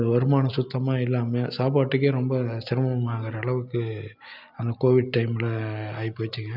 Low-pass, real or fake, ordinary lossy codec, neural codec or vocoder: 5.4 kHz; real; none; none